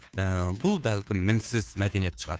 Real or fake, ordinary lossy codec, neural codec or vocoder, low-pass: fake; none; codec, 16 kHz, 2 kbps, FunCodec, trained on Chinese and English, 25 frames a second; none